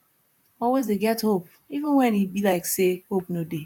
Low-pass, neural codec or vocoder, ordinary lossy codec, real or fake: 19.8 kHz; vocoder, 44.1 kHz, 128 mel bands, Pupu-Vocoder; none; fake